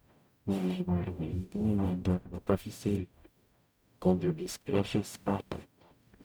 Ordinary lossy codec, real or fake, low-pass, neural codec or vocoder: none; fake; none; codec, 44.1 kHz, 0.9 kbps, DAC